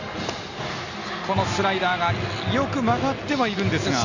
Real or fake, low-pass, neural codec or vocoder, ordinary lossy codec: real; 7.2 kHz; none; none